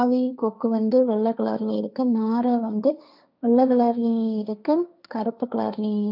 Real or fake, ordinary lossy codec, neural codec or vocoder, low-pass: fake; none; codec, 16 kHz, 1.1 kbps, Voila-Tokenizer; 5.4 kHz